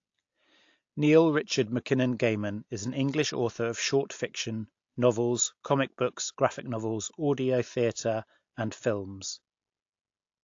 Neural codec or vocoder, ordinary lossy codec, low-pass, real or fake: none; AAC, 48 kbps; 7.2 kHz; real